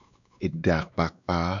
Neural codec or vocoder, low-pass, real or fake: codec, 16 kHz, 2 kbps, X-Codec, WavLM features, trained on Multilingual LibriSpeech; 7.2 kHz; fake